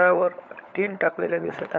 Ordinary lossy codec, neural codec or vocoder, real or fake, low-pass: none; codec, 16 kHz, 16 kbps, FunCodec, trained on LibriTTS, 50 frames a second; fake; none